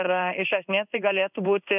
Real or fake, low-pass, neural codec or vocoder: fake; 3.6 kHz; codec, 16 kHz in and 24 kHz out, 1 kbps, XY-Tokenizer